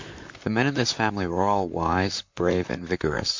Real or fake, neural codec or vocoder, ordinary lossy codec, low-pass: fake; vocoder, 44.1 kHz, 128 mel bands, Pupu-Vocoder; MP3, 48 kbps; 7.2 kHz